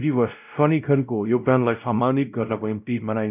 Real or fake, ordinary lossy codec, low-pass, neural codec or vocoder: fake; none; 3.6 kHz; codec, 16 kHz, 0.5 kbps, X-Codec, WavLM features, trained on Multilingual LibriSpeech